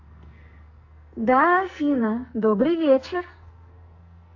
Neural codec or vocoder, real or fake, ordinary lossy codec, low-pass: codec, 44.1 kHz, 2.6 kbps, SNAC; fake; AAC, 48 kbps; 7.2 kHz